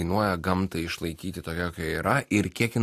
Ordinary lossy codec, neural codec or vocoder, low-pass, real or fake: AAC, 64 kbps; none; 14.4 kHz; real